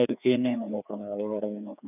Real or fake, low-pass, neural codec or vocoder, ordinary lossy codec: fake; 3.6 kHz; codec, 16 kHz, 2 kbps, FreqCodec, larger model; none